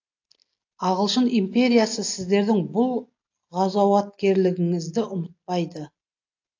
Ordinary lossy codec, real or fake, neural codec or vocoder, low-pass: AAC, 48 kbps; real; none; 7.2 kHz